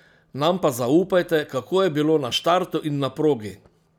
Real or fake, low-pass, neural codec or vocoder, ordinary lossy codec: real; 19.8 kHz; none; none